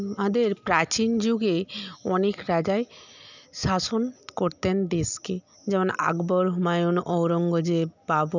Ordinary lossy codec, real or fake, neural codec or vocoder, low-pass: none; real; none; 7.2 kHz